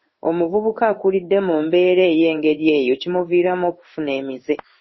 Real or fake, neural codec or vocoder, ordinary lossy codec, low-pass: fake; codec, 16 kHz in and 24 kHz out, 1 kbps, XY-Tokenizer; MP3, 24 kbps; 7.2 kHz